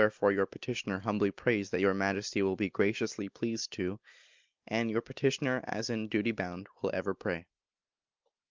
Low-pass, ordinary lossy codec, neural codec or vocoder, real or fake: 7.2 kHz; Opus, 32 kbps; none; real